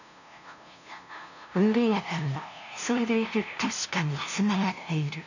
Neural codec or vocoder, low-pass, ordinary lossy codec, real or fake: codec, 16 kHz, 0.5 kbps, FunCodec, trained on LibriTTS, 25 frames a second; 7.2 kHz; none; fake